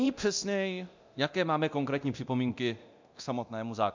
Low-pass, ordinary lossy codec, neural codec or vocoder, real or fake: 7.2 kHz; MP3, 64 kbps; codec, 24 kHz, 0.9 kbps, DualCodec; fake